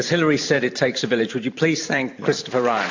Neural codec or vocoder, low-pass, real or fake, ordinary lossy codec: vocoder, 44.1 kHz, 128 mel bands every 512 samples, BigVGAN v2; 7.2 kHz; fake; AAC, 48 kbps